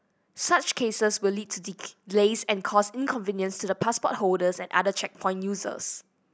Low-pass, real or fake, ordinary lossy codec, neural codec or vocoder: none; real; none; none